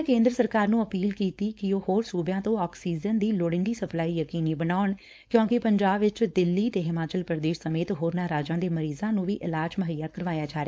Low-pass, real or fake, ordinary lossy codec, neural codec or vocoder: none; fake; none; codec, 16 kHz, 4.8 kbps, FACodec